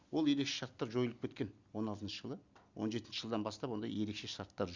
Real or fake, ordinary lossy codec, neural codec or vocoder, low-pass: real; Opus, 64 kbps; none; 7.2 kHz